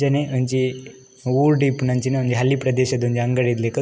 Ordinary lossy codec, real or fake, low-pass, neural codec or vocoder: none; real; none; none